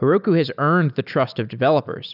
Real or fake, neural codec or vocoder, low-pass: real; none; 5.4 kHz